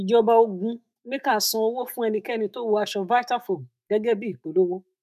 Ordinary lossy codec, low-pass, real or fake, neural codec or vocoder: none; 14.4 kHz; fake; autoencoder, 48 kHz, 128 numbers a frame, DAC-VAE, trained on Japanese speech